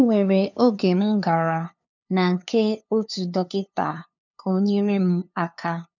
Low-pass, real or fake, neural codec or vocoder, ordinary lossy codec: 7.2 kHz; fake; codec, 16 kHz, 2 kbps, FunCodec, trained on LibriTTS, 25 frames a second; none